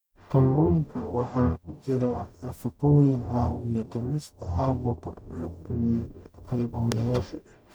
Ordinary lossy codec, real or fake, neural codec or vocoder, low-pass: none; fake; codec, 44.1 kHz, 0.9 kbps, DAC; none